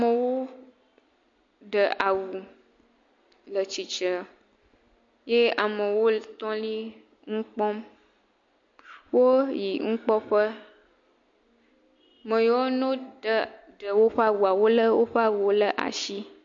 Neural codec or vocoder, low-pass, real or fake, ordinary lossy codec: none; 7.2 kHz; real; MP3, 48 kbps